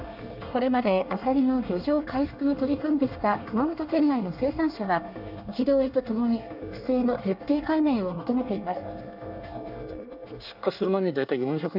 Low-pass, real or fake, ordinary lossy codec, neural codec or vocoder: 5.4 kHz; fake; none; codec, 24 kHz, 1 kbps, SNAC